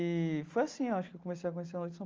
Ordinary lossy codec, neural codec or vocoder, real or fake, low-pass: Opus, 32 kbps; none; real; 7.2 kHz